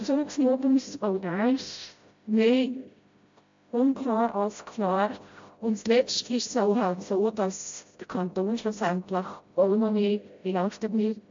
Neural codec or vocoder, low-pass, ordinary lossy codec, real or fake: codec, 16 kHz, 0.5 kbps, FreqCodec, smaller model; 7.2 kHz; MP3, 48 kbps; fake